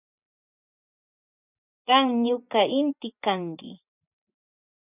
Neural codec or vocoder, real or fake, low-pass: vocoder, 24 kHz, 100 mel bands, Vocos; fake; 3.6 kHz